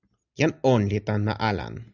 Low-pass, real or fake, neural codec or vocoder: 7.2 kHz; real; none